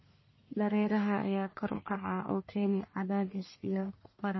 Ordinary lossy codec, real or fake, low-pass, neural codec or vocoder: MP3, 24 kbps; fake; 7.2 kHz; codec, 44.1 kHz, 1.7 kbps, Pupu-Codec